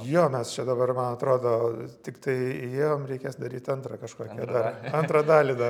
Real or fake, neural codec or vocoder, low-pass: real; none; 19.8 kHz